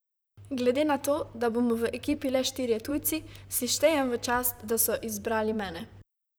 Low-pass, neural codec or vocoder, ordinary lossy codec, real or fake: none; vocoder, 44.1 kHz, 128 mel bands, Pupu-Vocoder; none; fake